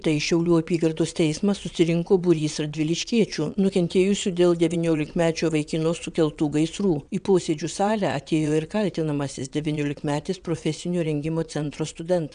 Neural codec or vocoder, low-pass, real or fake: vocoder, 22.05 kHz, 80 mel bands, WaveNeXt; 9.9 kHz; fake